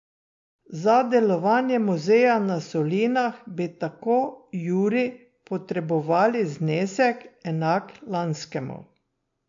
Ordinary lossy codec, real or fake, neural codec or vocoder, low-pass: MP3, 48 kbps; real; none; 7.2 kHz